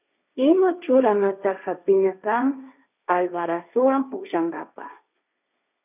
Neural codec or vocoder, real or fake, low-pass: codec, 16 kHz, 1.1 kbps, Voila-Tokenizer; fake; 3.6 kHz